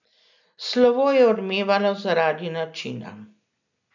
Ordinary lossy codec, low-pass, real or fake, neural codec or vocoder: none; 7.2 kHz; real; none